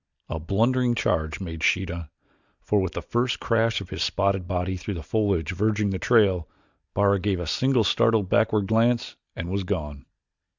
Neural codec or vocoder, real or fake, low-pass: none; real; 7.2 kHz